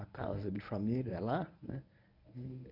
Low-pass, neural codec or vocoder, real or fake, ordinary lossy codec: 5.4 kHz; codec, 24 kHz, 0.9 kbps, WavTokenizer, medium speech release version 1; fake; none